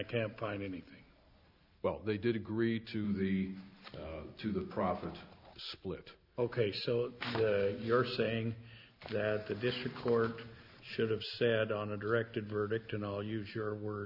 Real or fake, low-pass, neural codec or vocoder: real; 5.4 kHz; none